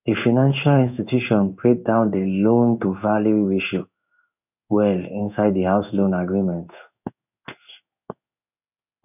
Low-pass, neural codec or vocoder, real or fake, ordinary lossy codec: 3.6 kHz; codec, 16 kHz in and 24 kHz out, 1 kbps, XY-Tokenizer; fake; none